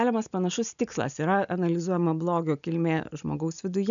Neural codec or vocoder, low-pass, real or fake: none; 7.2 kHz; real